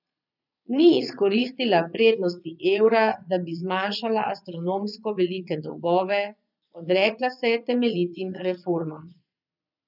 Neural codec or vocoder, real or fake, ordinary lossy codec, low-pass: vocoder, 22.05 kHz, 80 mel bands, Vocos; fake; none; 5.4 kHz